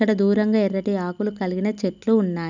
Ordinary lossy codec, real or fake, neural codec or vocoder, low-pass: none; real; none; 7.2 kHz